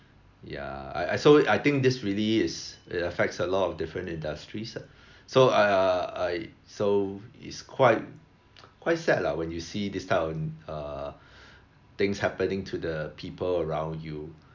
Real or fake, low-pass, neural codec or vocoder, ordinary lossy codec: real; 7.2 kHz; none; MP3, 64 kbps